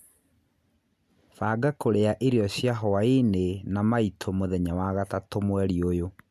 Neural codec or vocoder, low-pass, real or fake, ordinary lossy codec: none; 14.4 kHz; real; none